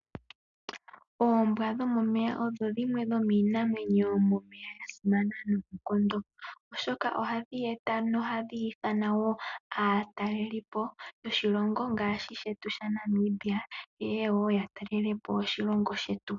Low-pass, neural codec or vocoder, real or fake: 7.2 kHz; none; real